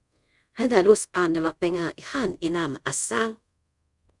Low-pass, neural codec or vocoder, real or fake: 10.8 kHz; codec, 24 kHz, 0.5 kbps, DualCodec; fake